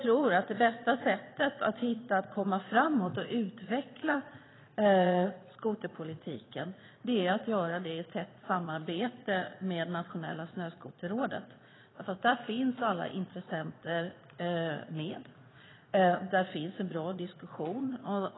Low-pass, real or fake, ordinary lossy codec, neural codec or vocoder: 7.2 kHz; fake; AAC, 16 kbps; vocoder, 22.05 kHz, 80 mel bands, Vocos